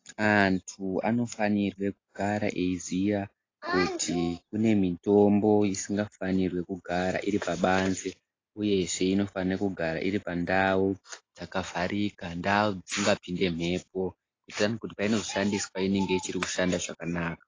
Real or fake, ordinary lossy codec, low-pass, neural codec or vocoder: real; AAC, 32 kbps; 7.2 kHz; none